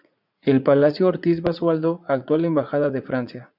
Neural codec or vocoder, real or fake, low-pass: vocoder, 44.1 kHz, 80 mel bands, Vocos; fake; 5.4 kHz